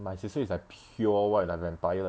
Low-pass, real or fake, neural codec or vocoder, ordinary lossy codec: none; real; none; none